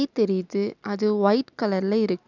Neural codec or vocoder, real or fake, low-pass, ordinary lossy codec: none; real; 7.2 kHz; none